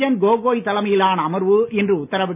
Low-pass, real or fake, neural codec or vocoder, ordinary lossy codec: 3.6 kHz; real; none; none